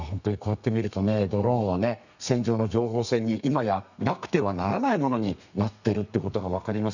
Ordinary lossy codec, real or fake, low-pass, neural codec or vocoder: none; fake; 7.2 kHz; codec, 32 kHz, 1.9 kbps, SNAC